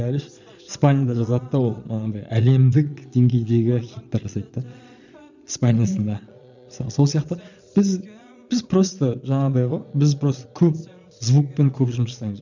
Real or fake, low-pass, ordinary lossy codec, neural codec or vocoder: fake; 7.2 kHz; none; vocoder, 22.05 kHz, 80 mel bands, Vocos